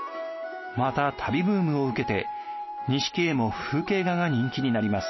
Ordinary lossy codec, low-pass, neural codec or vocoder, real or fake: MP3, 24 kbps; 7.2 kHz; none; real